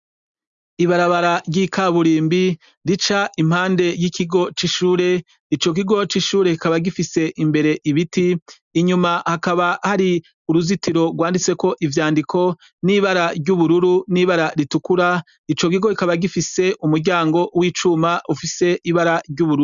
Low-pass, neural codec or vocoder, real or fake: 7.2 kHz; none; real